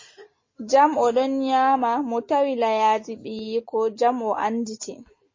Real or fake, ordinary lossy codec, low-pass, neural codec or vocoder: real; MP3, 32 kbps; 7.2 kHz; none